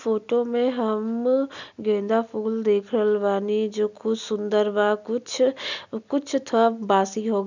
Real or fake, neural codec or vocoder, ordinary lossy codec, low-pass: real; none; none; 7.2 kHz